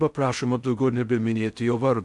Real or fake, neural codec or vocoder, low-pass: fake; codec, 16 kHz in and 24 kHz out, 0.6 kbps, FocalCodec, streaming, 4096 codes; 10.8 kHz